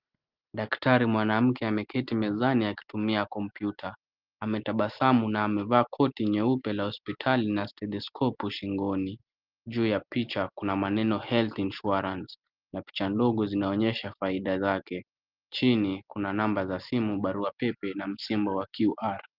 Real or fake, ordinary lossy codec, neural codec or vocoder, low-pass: real; Opus, 32 kbps; none; 5.4 kHz